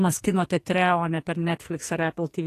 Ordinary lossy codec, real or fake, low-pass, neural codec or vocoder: AAC, 48 kbps; fake; 14.4 kHz; codec, 44.1 kHz, 2.6 kbps, SNAC